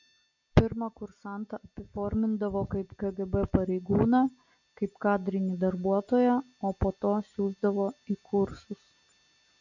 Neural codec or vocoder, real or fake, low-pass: none; real; 7.2 kHz